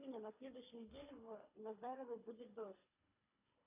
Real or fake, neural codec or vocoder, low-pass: fake; codec, 24 kHz, 3 kbps, HILCodec; 3.6 kHz